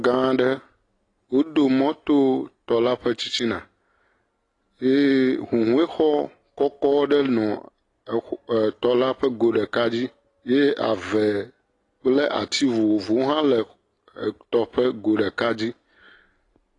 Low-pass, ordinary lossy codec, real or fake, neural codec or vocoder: 10.8 kHz; AAC, 32 kbps; real; none